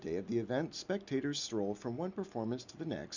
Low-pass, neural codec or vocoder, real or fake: 7.2 kHz; none; real